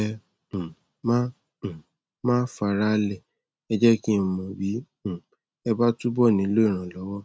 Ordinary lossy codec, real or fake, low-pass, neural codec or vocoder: none; real; none; none